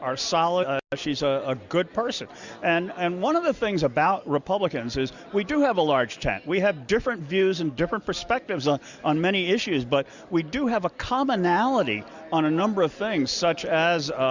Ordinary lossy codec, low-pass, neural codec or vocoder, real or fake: Opus, 64 kbps; 7.2 kHz; none; real